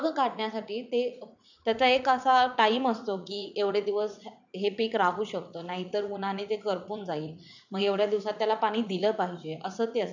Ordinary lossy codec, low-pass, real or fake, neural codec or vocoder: none; 7.2 kHz; fake; vocoder, 44.1 kHz, 80 mel bands, Vocos